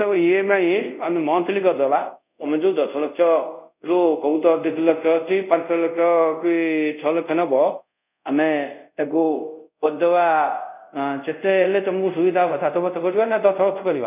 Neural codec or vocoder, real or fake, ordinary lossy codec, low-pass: codec, 24 kHz, 0.5 kbps, DualCodec; fake; none; 3.6 kHz